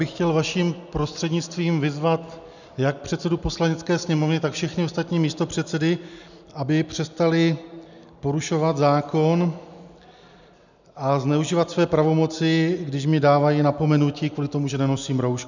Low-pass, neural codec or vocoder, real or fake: 7.2 kHz; none; real